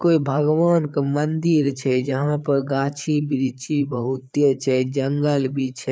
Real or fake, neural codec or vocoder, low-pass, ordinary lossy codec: fake; codec, 16 kHz, 4 kbps, FreqCodec, larger model; none; none